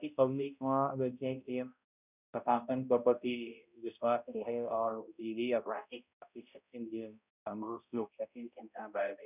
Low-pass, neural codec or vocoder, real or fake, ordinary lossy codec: 3.6 kHz; codec, 16 kHz, 0.5 kbps, X-Codec, HuBERT features, trained on balanced general audio; fake; none